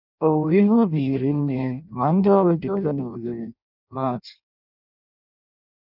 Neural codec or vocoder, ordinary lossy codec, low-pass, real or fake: codec, 16 kHz in and 24 kHz out, 0.6 kbps, FireRedTTS-2 codec; none; 5.4 kHz; fake